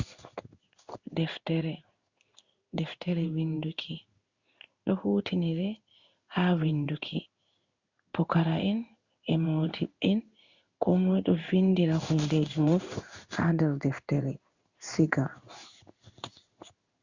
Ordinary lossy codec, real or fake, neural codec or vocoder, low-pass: Opus, 64 kbps; fake; codec, 16 kHz in and 24 kHz out, 1 kbps, XY-Tokenizer; 7.2 kHz